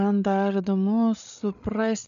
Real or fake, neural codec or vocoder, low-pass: fake; codec, 16 kHz, 8 kbps, FreqCodec, larger model; 7.2 kHz